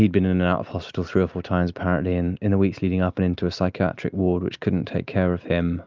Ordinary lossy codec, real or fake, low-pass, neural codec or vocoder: Opus, 24 kbps; real; 7.2 kHz; none